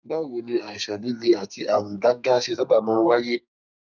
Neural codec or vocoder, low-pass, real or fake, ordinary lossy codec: codec, 32 kHz, 1.9 kbps, SNAC; 7.2 kHz; fake; none